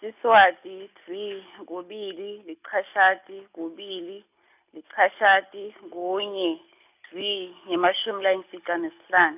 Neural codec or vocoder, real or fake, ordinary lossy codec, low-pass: none; real; none; 3.6 kHz